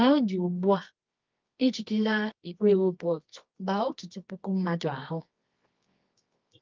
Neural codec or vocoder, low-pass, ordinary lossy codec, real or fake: codec, 24 kHz, 0.9 kbps, WavTokenizer, medium music audio release; 7.2 kHz; Opus, 32 kbps; fake